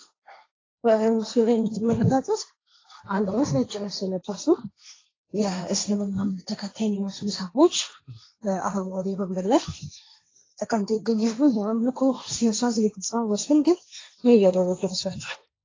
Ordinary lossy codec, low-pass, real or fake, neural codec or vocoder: AAC, 32 kbps; 7.2 kHz; fake; codec, 16 kHz, 1.1 kbps, Voila-Tokenizer